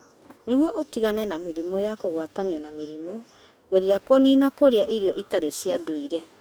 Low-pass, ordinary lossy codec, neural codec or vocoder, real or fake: none; none; codec, 44.1 kHz, 2.6 kbps, DAC; fake